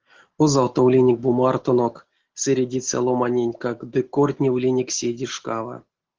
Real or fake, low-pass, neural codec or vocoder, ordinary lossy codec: real; 7.2 kHz; none; Opus, 16 kbps